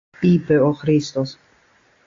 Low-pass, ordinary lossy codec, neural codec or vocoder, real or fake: 7.2 kHz; AAC, 48 kbps; none; real